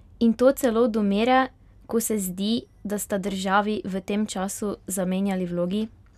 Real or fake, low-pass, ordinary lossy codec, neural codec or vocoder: real; 14.4 kHz; none; none